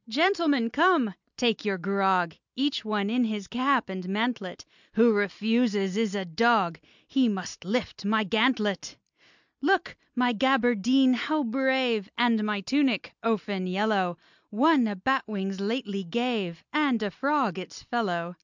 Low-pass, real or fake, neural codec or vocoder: 7.2 kHz; real; none